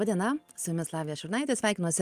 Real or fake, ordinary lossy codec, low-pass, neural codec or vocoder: real; Opus, 32 kbps; 14.4 kHz; none